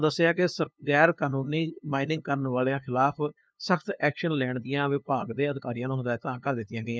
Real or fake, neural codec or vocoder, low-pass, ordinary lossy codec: fake; codec, 16 kHz, 2 kbps, FunCodec, trained on LibriTTS, 25 frames a second; none; none